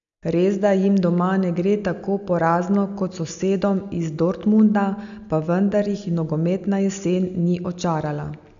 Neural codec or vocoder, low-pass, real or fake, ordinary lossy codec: none; 7.2 kHz; real; none